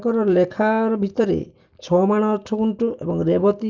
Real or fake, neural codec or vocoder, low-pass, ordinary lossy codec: real; none; 7.2 kHz; Opus, 24 kbps